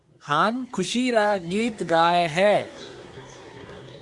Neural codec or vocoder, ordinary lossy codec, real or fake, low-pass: codec, 24 kHz, 1 kbps, SNAC; Opus, 64 kbps; fake; 10.8 kHz